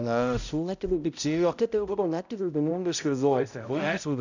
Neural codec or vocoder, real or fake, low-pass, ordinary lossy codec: codec, 16 kHz, 0.5 kbps, X-Codec, HuBERT features, trained on balanced general audio; fake; 7.2 kHz; none